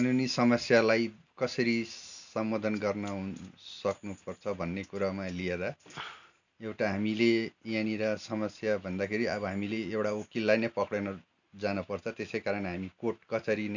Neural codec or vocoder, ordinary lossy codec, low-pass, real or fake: none; none; 7.2 kHz; real